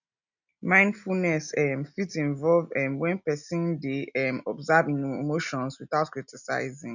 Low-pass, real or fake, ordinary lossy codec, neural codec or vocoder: 7.2 kHz; real; none; none